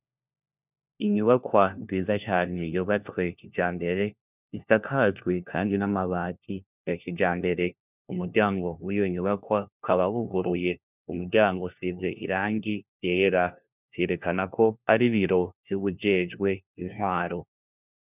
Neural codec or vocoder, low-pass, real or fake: codec, 16 kHz, 1 kbps, FunCodec, trained on LibriTTS, 50 frames a second; 3.6 kHz; fake